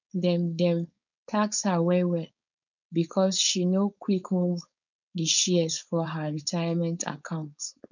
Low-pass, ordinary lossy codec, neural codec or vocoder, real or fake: 7.2 kHz; none; codec, 16 kHz, 4.8 kbps, FACodec; fake